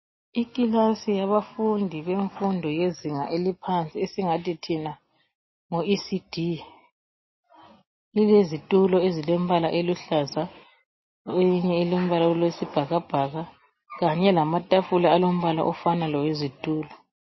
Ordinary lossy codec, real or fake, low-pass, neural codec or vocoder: MP3, 24 kbps; real; 7.2 kHz; none